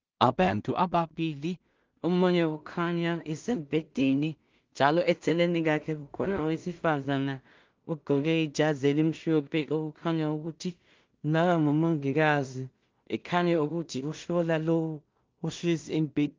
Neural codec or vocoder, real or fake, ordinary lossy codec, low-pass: codec, 16 kHz in and 24 kHz out, 0.4 kbps, LongCat-Audio-Codec, two codebook decoder; fake; Opus, 32 kbps; 7.2 kHz